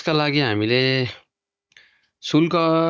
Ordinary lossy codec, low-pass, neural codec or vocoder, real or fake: none; none; codec, 16 kHz, 16 kbps, FunCodec, trained on Chinese and English, 50 frames a second; fake